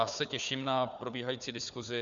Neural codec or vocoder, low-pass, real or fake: codec, 16 kHz, 4 kbps, FunCodec, trained on Chinese and English, 50 frames a second; 7.2 kHz; fake